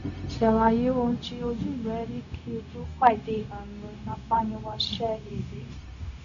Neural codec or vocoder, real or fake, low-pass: codec, 16 kHz, 0.4 kbps, LongCat-Audio-Codec; fake; 7.2 kHz